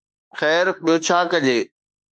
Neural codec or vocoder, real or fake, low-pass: autoencoder, 48 kHz, 32 numbers a frame, DAC-VAE, trained on Japanese speech; fake; 9.9 kHz